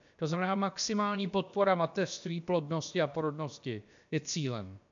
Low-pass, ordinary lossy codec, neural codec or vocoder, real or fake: 7.2 kHz; MP3, 64 kbps; codec, 16 kHz, about 1 kbps, DyCAST, with the encoder's durations; fake